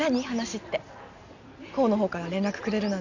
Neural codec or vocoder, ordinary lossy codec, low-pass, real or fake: none; none; 7.2 kHz; real